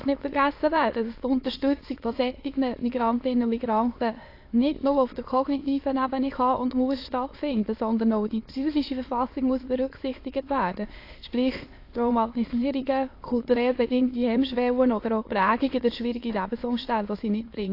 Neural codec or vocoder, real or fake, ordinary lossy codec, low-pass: autoencoder, 22.05 kHz, a latent of 192 numbers a frame, VITS, trained on many speakers; fake; AAC, 32 kbps; 5.4 kHz